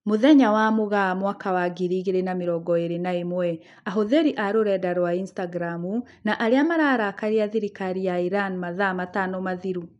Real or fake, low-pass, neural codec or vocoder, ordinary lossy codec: real; 10.8 kHz; none; none